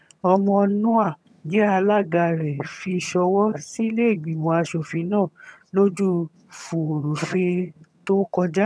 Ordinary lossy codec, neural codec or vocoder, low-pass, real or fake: none; vocoder, 22.05 kHz, 80 mel bands, HiFi-GAN; none; fake